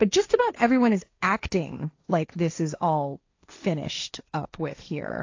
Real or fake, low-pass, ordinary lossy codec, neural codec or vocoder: fake; 7.2 kHz; AAC, 48 kbps; codec, 16 kHz, 1.1 kbps, Voila-Tokenizer